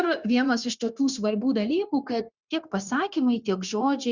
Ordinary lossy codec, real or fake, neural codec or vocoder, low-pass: Opus, 64 kbps; fake; codec, 16 kHz, 0.9 kbps, LongCat-Audio-Codec; 7.2 kHz